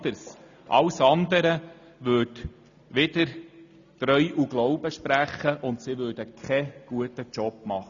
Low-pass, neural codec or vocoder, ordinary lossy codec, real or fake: 7.2 kHz; none; none; real